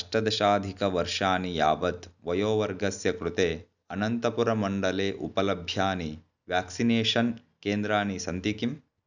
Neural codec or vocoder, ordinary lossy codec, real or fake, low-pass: none; none; real; 7.2 kHz